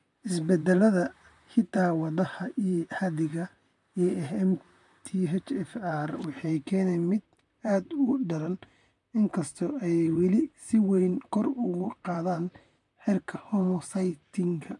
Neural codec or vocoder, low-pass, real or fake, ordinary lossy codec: vocoder, 44.1 kHz, 128 mel bands, Pupu-Vocoder; 10.8 kHz; fake; none